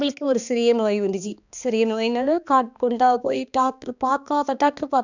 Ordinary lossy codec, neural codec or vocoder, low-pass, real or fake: none; codec, 16 kHz, 2 kbps, X-Codec, HuBERT features, trained on balanced general audio; 7.2 kHz; fake